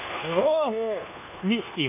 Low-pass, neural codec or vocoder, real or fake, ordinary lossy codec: 3.6 kHz; codec, 24 kHz, 1.2 kbps, DualCodec; fake; MP3, 32 kbps